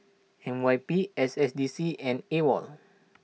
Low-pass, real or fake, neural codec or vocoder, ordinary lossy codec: none; real; none; none